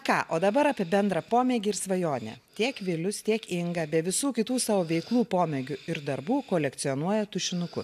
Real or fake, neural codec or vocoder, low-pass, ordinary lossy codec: real; none; 14.4 kHz; AAC, 96 kbps